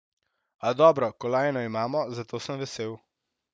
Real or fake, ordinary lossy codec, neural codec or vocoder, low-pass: real; none; none; none